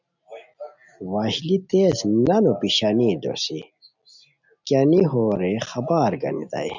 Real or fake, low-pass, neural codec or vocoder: real; 7.2 kHz; none